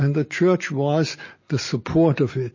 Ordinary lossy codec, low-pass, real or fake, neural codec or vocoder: MP3, 32 kbps; 7.2 kHz; real; none